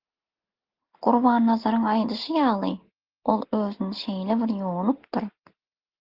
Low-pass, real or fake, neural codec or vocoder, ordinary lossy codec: 5.4 kHz; real; none; Opus, 16 kbps